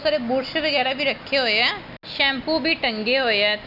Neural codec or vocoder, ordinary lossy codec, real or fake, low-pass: none; none; real; 5.4 kHz